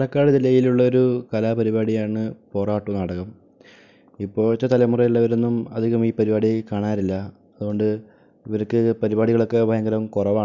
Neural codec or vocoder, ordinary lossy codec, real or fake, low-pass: none; none; real; 7.2 kHz